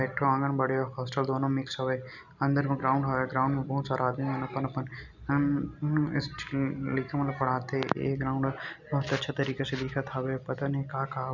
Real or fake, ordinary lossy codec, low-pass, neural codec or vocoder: real; none; 7.2 kHz; none